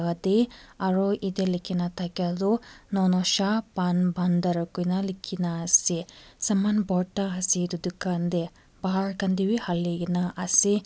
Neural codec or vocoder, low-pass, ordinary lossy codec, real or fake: none; none; none; real